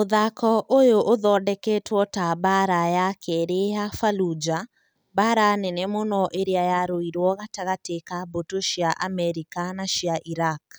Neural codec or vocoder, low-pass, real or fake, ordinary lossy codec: none; none; real; none